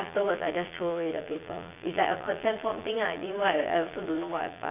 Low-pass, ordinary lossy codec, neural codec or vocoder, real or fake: 3.6 kHz; none; vocoder, 22.05 kHz, 80 mel bands, Vocos; fake